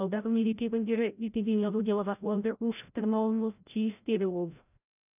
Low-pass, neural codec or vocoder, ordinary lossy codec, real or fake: 3.6 kHz; codec, 16 kHz, 0.5 kbps, FreqCodec, larger model; none; fake